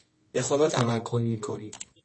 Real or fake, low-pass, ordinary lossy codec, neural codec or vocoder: fake; 10.8 kHz; MP3, 32 kbps; codec, 24 kHz, 0.9 kbps, WavTokenizer, medium music audio release